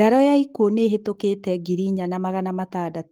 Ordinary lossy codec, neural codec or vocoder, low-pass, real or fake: Opus, 24 kbps; codec, 44.1 kHz, 7.8 kbps, Pupu-Codec; 19.8 kHz; fake